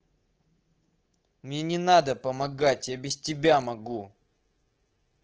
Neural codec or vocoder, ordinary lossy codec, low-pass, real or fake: codec, 24 kHz, 3.1 kbps, DualCodec; Opus, 16 kbps; 7.2 kHz; fake